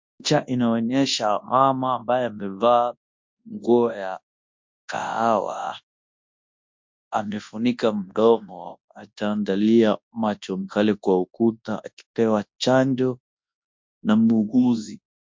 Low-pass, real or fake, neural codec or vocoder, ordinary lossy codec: 7.2 kHz; fake; codec, 24 kHz, 0.9 kbps, WavTokenizer, large speech release; MP3, 48 kbps